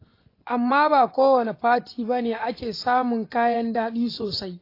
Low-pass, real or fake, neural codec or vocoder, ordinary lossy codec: 5.4 kHz; fake; vocoder, 24 kHz, 100 mel bands, Vocos; AAC, 32 kbps